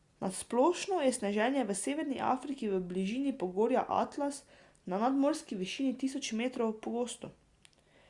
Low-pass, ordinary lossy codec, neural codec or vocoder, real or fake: 10.8 kHz; Opus, 64 kbps; none; real